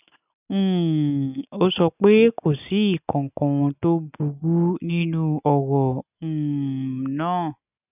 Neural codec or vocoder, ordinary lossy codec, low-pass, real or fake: none; none; 3.6 kHz; real